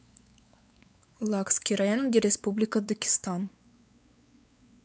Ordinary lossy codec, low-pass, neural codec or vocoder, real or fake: none; none; codec, 16 kHz, 4 kbps, X-Codec, WavLM features, trained on Multilingual LibriSpeech; fake